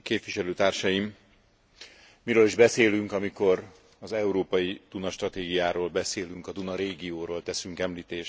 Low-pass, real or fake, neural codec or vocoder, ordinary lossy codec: none; real; none; none